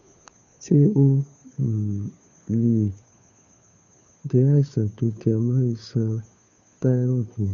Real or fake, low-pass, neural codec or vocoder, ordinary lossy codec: fake; 7.2 kHz; codec, 16 kHz, 2 kbps, FunCodec, trained on Chinese and English, 25 frames a second; none